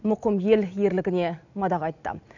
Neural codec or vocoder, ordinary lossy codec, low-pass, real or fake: none; none; 7.2 kHz; real